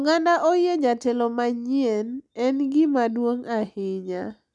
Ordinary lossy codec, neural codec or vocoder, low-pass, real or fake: none; none; 10.8 kHz; real